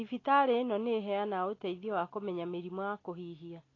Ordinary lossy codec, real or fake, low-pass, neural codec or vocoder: AAC, 32 kbps; real; 7.2 kHz; none